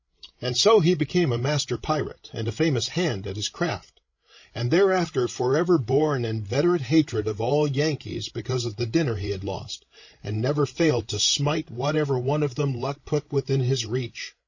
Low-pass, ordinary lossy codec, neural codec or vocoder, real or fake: 7.2 kHz; MP3, 32 kbps; codec, 16 kHz, 16 kbps, FreqCodec, larger model; fake